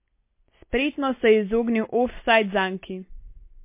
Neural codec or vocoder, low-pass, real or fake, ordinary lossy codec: none; 3.6 kHz; real; MP3, 24 kbps